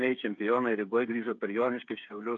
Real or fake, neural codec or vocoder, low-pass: fake; codec, 16 kHz, 8 kbps, FreqCodec, smaller model; 7.2 kHz